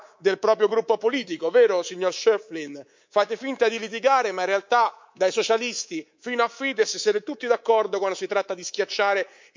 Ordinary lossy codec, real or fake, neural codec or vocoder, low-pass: MP3, 64 kbps; fake; codec, 24 kHz, 3.1 kbps, DualCodec; 7.2 kHz